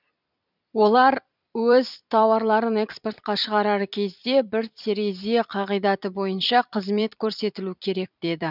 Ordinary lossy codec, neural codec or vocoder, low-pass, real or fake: none; vocoder, 44.1 kHz, 128 mel bands every 256 samples, BigVGAN v2; 5.4 kHz; fake